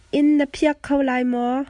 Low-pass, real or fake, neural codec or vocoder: 10.8 kHz; real; none